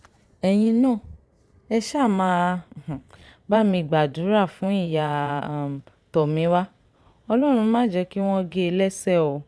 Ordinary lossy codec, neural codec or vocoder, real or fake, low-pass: none; vocoder, 22.05 kHz, 80 mel bands, Vocos; fake; none